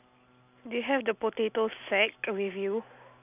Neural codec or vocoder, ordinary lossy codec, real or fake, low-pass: none; none; real; 3.6 kHz